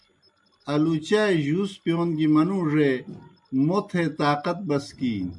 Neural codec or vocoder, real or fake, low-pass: none; real; 10.8 kHz